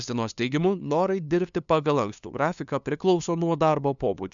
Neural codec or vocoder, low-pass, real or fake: codec, 16 kHz, 2 kbps, FunCodec, trained on LibriTTS, 25 frames a second; 7.2 kHz; fake